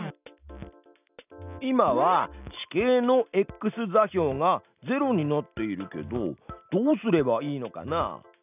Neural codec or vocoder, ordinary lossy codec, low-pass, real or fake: none; none; 3.6 kHz; real